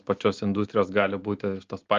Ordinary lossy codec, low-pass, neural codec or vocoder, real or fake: Opus, 32 kbps; 7.2 kHz; none; real